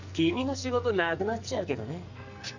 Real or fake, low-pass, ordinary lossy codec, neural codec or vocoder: fake; 7.2 kHz; none; codec, 44.1 kHz, 2.6 kbps, SNAC